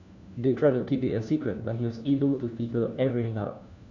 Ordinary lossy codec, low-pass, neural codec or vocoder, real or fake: none; 7.2 kHz; codec, 16 kHz, 1 kbps, FunCodec, trained on LibriTTS, 50 frames a second; fake